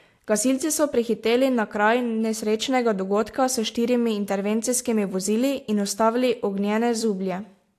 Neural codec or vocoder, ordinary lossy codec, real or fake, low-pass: none; AAC, 64 kbps; real; 14.4 kHz